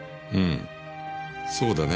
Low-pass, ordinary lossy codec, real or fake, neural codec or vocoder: none; none; real; none